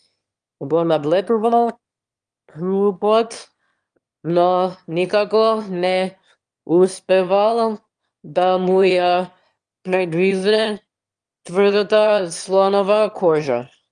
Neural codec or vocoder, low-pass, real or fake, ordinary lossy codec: autoencoder, 22.05 kHz, a latent of 192 numbers a frame, VITS, trained on one speaker; 9.9 kHz; fake; Opus, 32 kbps